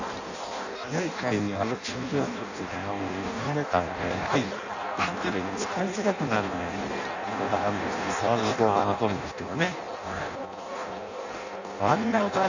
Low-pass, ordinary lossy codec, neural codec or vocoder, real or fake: 7.2 kHz; none; codec, 16 kHz in and 24 kHz out, 0.6 kbps, FireRedTTS-2 codec; fake